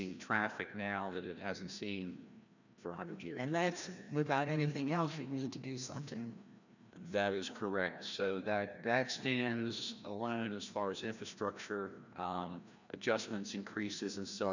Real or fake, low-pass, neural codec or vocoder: fake; 7.2 kHz; codec, 16 kHz, 1 kbps, FreqCodec, larger model